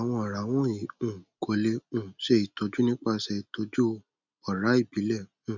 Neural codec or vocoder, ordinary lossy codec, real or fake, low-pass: none; none; real; 7.2 kHz